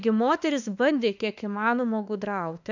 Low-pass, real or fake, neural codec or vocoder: 7.2 kHz; fake; autoencoder, 48 kHz, 32 numbers a frame, DAC-VAE, trained on Japanese speech